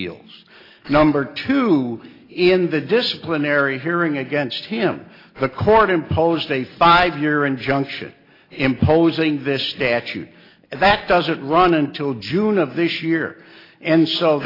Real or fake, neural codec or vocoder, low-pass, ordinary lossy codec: real; none; 5.4 kHz; AAC, 24 kbps